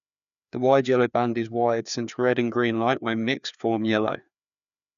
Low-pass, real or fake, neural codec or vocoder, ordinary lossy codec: 7.2 kHz; fake; codec, 16 kHz, 2 kbps, FreqCodec, larger model; none